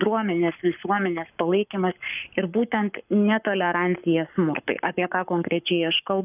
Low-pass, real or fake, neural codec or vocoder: 3.6 kHz; fake; codec, 44.1 kHz, 7.8 kbps, Pupu-Codec